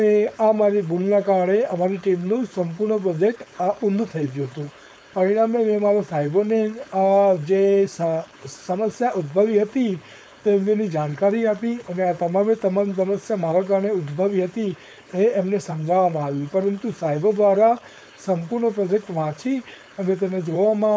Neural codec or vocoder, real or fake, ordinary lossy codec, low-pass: codec, 16 kHz, 4.8 kbps, FACodec; fake; none; none